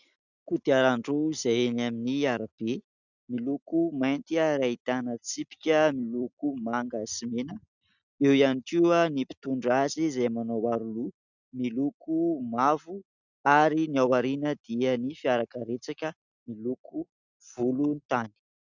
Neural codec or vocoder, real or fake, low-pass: none; real; 7.2 kHz